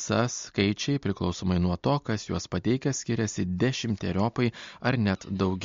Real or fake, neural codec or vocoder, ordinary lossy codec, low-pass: real; none; MP3, 48 kbps; 7.2 kHz